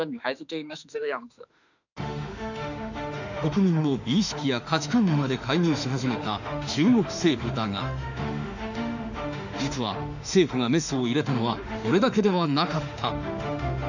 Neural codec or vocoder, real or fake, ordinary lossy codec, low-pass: autoencoder, 48 kHz, 32 numbers a frame, DAC-VAE, trained on Japanese speech; fake; none; 7.2 kHz